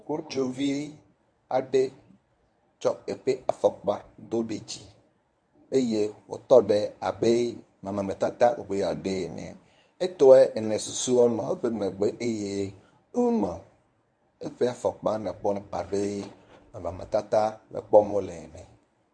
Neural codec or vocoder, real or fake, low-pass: codec, 24 kHz, 0.9 kbps, WavTokenizer, medium speech release version 1; fake; 9.9 kHz